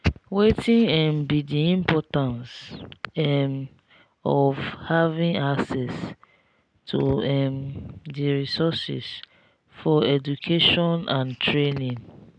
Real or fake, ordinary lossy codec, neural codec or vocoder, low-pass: real; none; none; 9.9 kHz